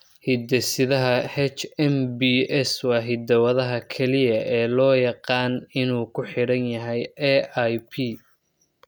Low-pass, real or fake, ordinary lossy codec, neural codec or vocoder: none; real; none; none